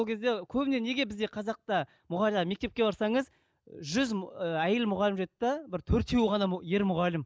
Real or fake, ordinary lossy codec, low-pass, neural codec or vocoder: real; none; none; none